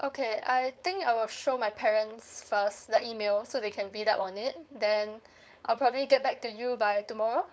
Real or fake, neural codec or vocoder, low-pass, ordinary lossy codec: fake; codec, 16 kHz, 4.8 kbps, FACodec; none; none